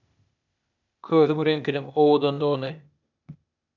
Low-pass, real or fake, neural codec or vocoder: 7.2 kHz; fake; codec, 16 kHz, 0.8 kbps, ZipCodec